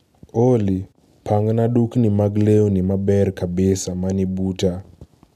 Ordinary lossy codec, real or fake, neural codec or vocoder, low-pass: none; real; none; 14.4 kHz